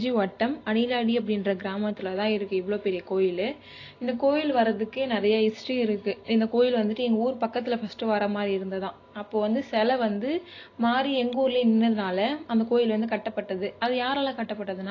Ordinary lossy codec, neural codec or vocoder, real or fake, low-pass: AAC, 32 kbps; none; real; 7.2 kHz